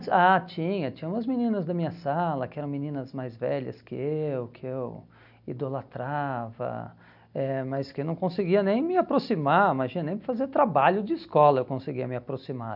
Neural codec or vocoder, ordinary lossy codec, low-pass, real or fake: none; none; 5.4 kHz; real